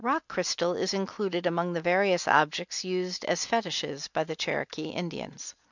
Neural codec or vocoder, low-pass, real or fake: none; 7.2 kHz; real